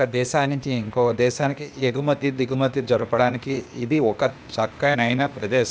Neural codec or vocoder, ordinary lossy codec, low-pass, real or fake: codec, 16 kHz, 0.8 kbps, ZipCodec; none; none; fake